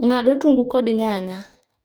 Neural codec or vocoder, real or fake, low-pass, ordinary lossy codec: codec, 44.1 kHz, 2.6 kbps, DAC; fake; none; none